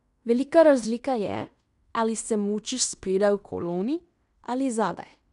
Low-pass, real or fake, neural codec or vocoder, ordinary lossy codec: 10.8 kHz; fake; codec, 16 kHz in and 24 kHz out, 0.9 kbps, LongCat-Audio-Codec, fine tuned four codebook decoder; none